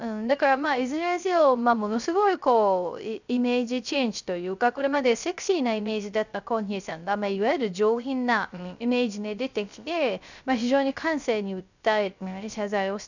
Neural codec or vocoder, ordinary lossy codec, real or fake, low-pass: codec, 16 kHz, 0.3 kbps, FocalCodec; none; fake; 7.2 kHz